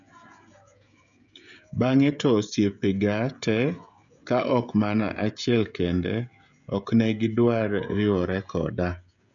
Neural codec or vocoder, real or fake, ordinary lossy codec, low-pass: codec, 16 kHz, 16 kbps, FreqCodec, smaller model; fake; none; 7.2 kHz